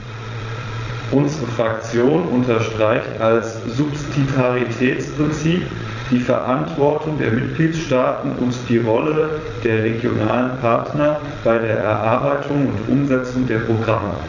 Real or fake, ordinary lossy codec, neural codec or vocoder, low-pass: fake; none; vocoder, 22.05 kHz, 80 mel bands, Vocos; 7.2 kHz